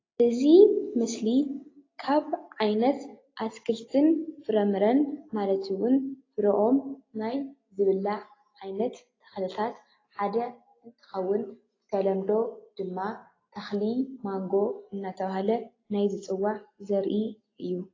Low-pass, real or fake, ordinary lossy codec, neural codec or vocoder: 7.2 kHz; real; AAC, 32 kbps; none